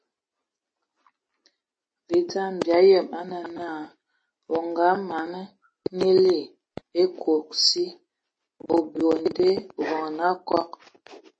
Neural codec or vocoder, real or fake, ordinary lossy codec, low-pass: none; real; MP3, 32 kbps; 9.9 kHz